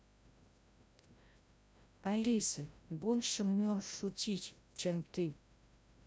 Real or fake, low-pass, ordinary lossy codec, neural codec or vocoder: fake; none; none; codec, 16 kHz, 0.5 kbps, FreqCodec, larger model